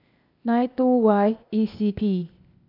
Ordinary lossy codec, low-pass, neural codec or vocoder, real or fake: none; 5.4 kHz; codec, 16 kHz, 0.8 kbps, ZipCodec; fake